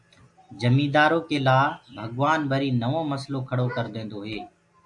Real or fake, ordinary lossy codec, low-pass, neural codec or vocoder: real; MP3, 96 kbps; 10.8 kHz; none